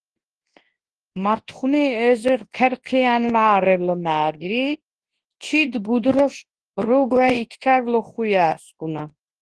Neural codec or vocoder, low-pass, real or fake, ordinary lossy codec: codec, 24 kHz, 0.9 kbps, WavTokenizer, large speech release; 10.8 kHz; fake; Opus, 16 kbps